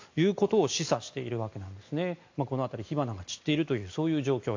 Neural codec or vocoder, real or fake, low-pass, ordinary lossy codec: none; real; 7.2 kHz; AAC, 48 kbps